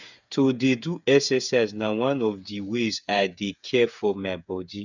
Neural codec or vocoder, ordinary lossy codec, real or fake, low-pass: codec, 16 kHz, 8 kbps, FreqCodec, smaller model; none; fake; 7.2 kHz